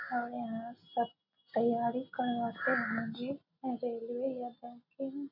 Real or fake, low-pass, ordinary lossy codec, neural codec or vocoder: real; 5.4 kHz; none; none